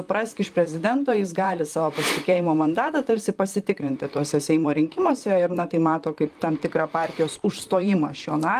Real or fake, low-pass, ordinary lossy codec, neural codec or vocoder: fake; 14.4 kHz; Opus, 32 kbps; vocoder, 44.1 kHz, 128 mel bands, Pupu-Vocoder